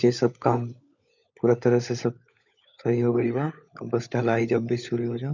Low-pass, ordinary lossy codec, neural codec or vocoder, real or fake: 7.2 kHz; none; codec, 16 kHz, 16 kbps, FunCodec, trained on LibriTTS, 50 frames a second; fake